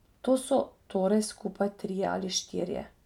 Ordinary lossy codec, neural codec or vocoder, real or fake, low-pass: none; none; real; 19.8 kHz